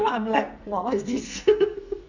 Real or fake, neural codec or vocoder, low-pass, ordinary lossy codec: fake; codec, 44.1 kHz, 2.6 kbps, SNAC; 7.2 kHz; none